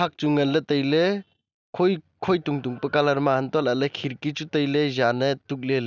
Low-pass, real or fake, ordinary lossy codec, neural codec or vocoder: 7.2 kHz; real; none; none